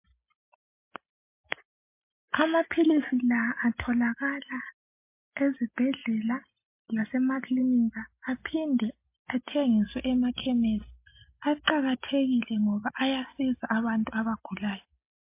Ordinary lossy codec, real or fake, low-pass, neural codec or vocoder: MP3, 24 kbps; real; 3.6 kHz; none